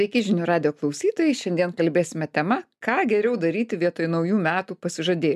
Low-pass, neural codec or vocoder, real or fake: 14.4 kHz; none; real